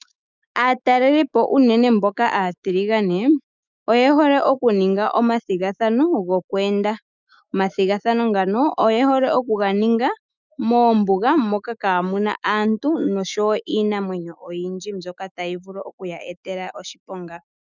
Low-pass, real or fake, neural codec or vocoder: 7.2 kHz; fake; autoencoder, 48 kHz, 128 numbers a frame, DAC-VAE, trained on Japanese speech